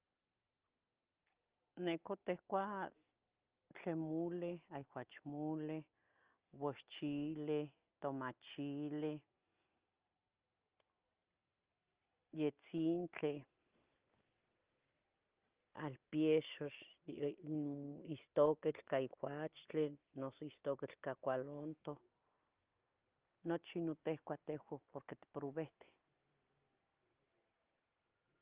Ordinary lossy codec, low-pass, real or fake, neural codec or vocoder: Opus, 32 kbps; 3.6 kHz; real; none